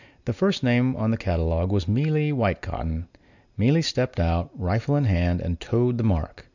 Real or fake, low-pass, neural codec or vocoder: real; 7.2 kHz; none